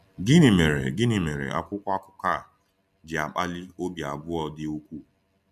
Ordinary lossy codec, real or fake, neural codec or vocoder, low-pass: none; real; none; 14.4 kHz